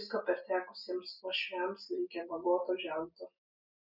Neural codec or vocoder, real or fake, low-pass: none; real; 5.4 kHz